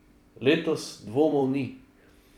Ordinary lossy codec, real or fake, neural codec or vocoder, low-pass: none; fake; vocoder, 44.1 kHz, 128 mel bands every 256 samples, BigVGAN v2; 19.8 kHz